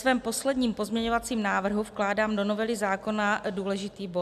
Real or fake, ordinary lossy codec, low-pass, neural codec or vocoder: real; AAC, 96 kbps; 14.4 kHz; none